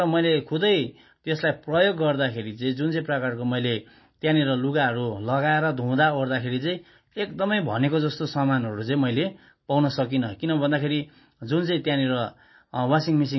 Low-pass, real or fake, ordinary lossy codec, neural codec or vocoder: 7.2 kHz; real; MP3, 24 kbps; none